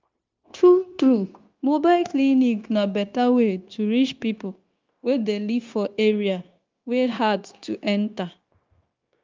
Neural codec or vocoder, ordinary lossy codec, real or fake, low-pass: codec, 16 kHz, 0.9 kbps, LongCat-Audio-Codec; Opus, 24 kbps; fake; 7.2 kHz